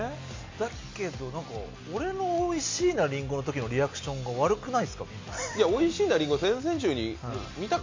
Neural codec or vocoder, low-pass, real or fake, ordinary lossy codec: none; 7.2 kHz; real; MP3, 48 kbps